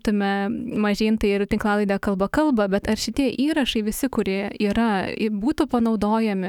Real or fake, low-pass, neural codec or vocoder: fake; 19.8 kHz; autoencoder, 48 kHz, 128 numbers a frame, DAC-VAE, trained on Japanese speech